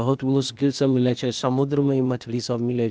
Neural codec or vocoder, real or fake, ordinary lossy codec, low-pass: codec, 16 kHz, 0.8 kbps, ZipCodec; fake; none; none